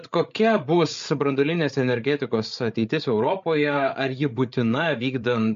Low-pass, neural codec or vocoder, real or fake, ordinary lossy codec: 7.2 kHz; codec, 16 kHz, 8 kbps, FreqCodec, larger model; fake; MP3, 48 kbps